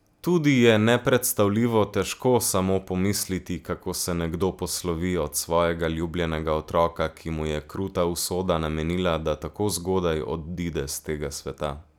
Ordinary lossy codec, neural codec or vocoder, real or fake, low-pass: none; none; real; none